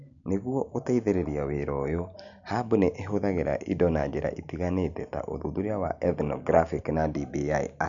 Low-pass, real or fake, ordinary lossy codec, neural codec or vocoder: 7.2 kHz; real; none; none